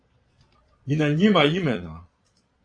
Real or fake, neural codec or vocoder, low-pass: fake; vocoder, 22.05 kHz, 80 mel bands, Vocos; 9.9 kHz